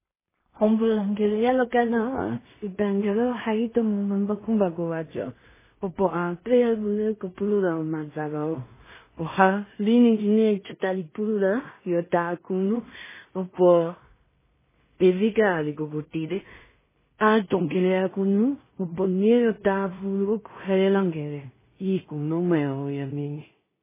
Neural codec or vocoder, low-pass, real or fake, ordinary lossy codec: codec, 16 kHz in and 24 kHz out, 0.4 kbps, LongCat-Audio-Codec, two codebook decoder; 3.6 kHz; fake; MP3, 16 kbps